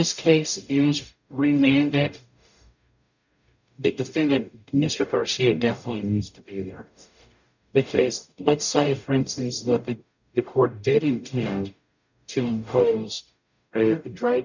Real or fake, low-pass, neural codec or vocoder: fake; 7.2 kHz; codec, 44.1 kHz, 0.9 kbps, DAC